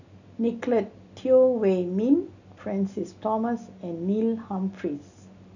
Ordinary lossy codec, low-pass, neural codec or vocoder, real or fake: none; 7.2 kHz; none; real